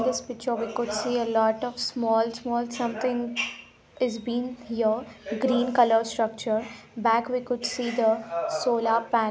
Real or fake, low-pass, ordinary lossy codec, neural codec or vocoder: real; none; none; none